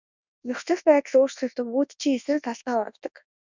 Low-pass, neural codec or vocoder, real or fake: 7.2 kHz; codec, 24 kHz, 0.9 kbps, WavTokenizer, large speech release; fake